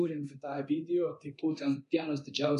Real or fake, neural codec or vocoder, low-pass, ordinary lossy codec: fake; codec, 24 kHz, 0.9 kbps, DualCodec; 10.8 kHz; MP3, 96 kbps